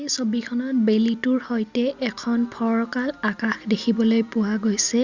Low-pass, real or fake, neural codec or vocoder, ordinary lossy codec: 7.2 kHz; real; none; Opus, 64 kbps